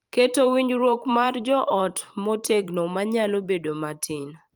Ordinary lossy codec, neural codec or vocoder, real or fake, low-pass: Opus, 32 kbps; vocoder, 44.1 kHz, 128 mel bands every 512 samples, BigVGAN v2; fake; 19.8 kHz